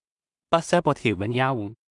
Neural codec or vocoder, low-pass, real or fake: codec, 16 kHz in and 24 kHz out, 0.4 kbps, LongCat-Audio-Codec, two codebook decoder; 10.8 kHz; fake